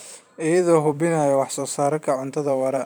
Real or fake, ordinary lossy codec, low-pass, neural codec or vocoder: fake; none; none; vocoder, 44.1 kHz, 128 mel bands every 512 samples, BigVGAN v2